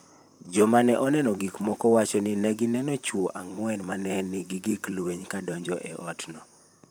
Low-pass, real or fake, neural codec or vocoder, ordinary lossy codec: none; fake; vocoder, 44.1 kHz, 128 mel bands, Pupu-Vocoder; none